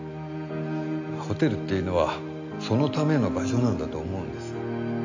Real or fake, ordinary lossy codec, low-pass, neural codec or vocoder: real; AAC, 48 kbps; 7.2 kHz; none